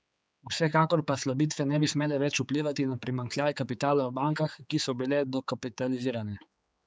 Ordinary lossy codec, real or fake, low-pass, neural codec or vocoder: none; fake; none; codec, 16 kHz, 4 kbps, X-Codec, HuBERT features, trained on general audio